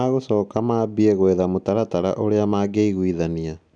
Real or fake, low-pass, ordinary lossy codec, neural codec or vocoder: real; 9.9 kHz; none; none